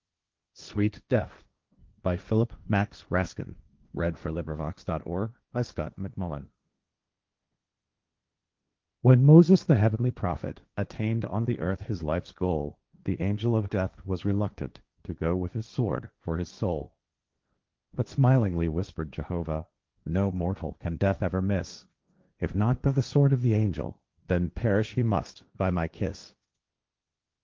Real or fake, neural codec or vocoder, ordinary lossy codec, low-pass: fake; codec, 16 kHz, 1.1 kbps, Voila-Tokenizer; Opus, 32 kbps; 7.2 kHz